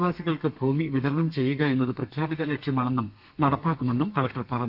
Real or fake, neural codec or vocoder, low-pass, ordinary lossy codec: fake; codec, 32 kHz, 1.9 kbps, SNAC; 5.4 kHz; none